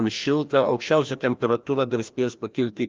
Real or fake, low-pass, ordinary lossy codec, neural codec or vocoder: fake; 7.2 kHz; Opus, 24 kbps; codec, 16 kHz, 1 kbps, FreqCodec, larger model